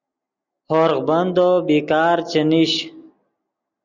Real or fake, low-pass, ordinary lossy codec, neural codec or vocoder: real; 7.2 kHz; Opus, 64 kbps; none